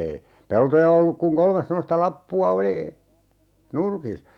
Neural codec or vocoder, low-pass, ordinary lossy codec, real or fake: autoencoder, 48 kHz, 128 numbers a frame, DAC-VAE, trained on Japanese speech; 19.8 kHz; Opus, 64 kbps; fake